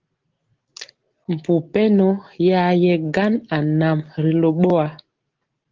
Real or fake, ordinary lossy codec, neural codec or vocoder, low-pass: real; Opus, 16 kbps; none; 7.2 kHz